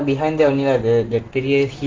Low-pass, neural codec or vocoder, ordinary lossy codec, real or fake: 7.2 kHz; none; Opus, 16 kbps; real